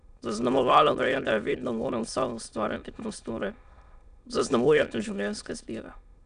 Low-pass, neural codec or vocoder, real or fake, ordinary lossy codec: 9.9 kHz; autoencoder, 22.05 kHz, a latent of 192 numbers a frame, VITS, trained on many speakers; fake; none